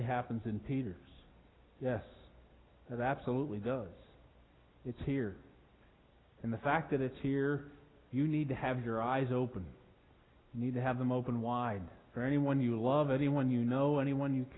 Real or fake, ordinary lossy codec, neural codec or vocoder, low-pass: real; AAC, 16 kbps; none; 7.2 kHz